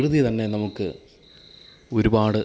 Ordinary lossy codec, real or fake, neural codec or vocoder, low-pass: none; real; none; none